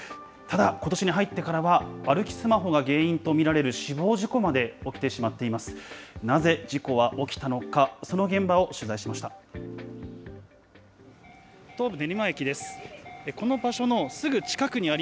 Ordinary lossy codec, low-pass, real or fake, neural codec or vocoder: none; none; real; none